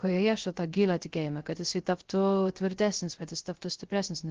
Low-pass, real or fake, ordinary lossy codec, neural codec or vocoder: 7.2 kHz; fake; Opus, 16 kbps; codec, 16 kHz, 0.3 kbps, FocalCodec